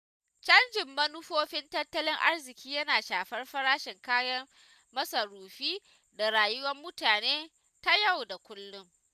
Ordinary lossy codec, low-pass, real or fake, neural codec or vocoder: none; 14.4 kHz; real; none